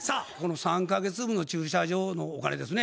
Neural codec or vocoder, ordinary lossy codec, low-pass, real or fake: none; none; none; real